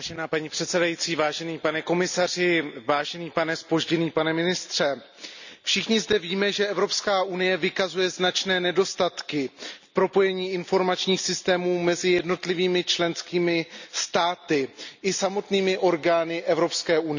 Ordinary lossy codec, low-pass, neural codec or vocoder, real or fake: none; 7.2 kHz; none; real